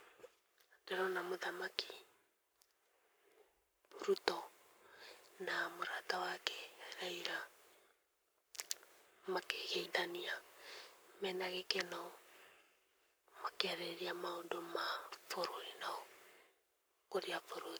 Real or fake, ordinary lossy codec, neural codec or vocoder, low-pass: fake; none; codec, 44.1 kHz, 7.8 kbps, Pupu-Codec; none